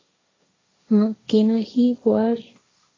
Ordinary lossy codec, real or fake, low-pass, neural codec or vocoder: AAC, 32 kbps; fake; 7.2 kHz; codec, 16 kHz, 1.1 kbps, Voila-Tokenizer